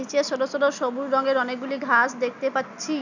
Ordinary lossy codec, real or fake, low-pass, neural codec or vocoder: none; real; 7.2 kHz; none